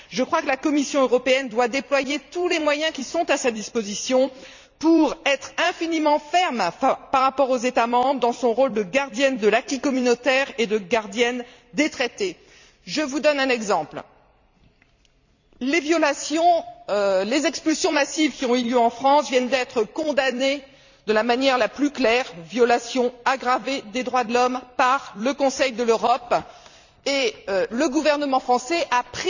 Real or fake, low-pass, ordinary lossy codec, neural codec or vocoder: fake; 7.2 kHz; none; vocoder, 44.1 kHz, 128 mel bands every 256 samples, BigVGAN v2